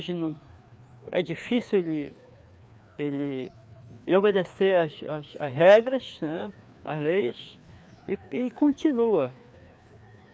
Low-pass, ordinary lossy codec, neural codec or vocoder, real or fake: none; none; codec, 16 kHz, 2 kbps, FreqCodec, larger model; fake